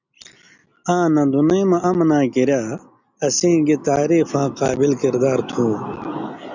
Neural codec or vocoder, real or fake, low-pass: none; real; 7.2 kHz